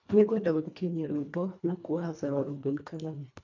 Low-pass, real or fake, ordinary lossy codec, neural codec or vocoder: 7.2 kHz; fake; none; codec, 24 kHz, 1.5 kbps, HILCodec